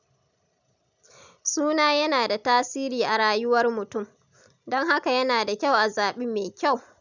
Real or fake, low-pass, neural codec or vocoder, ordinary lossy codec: real; 7.2 kHz; none; none